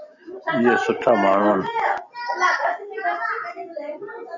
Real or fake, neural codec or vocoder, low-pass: real; none; 7.2 kHz